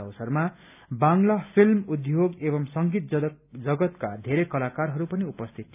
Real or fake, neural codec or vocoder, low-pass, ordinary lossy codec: real; none; 3.6 kHz; none